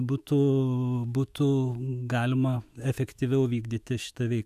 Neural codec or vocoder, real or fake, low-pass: autoencoder, 48 kHz, 128 numbers a frame, DAC-VAE, trained on Japanese speech; fake; 14.4 kHz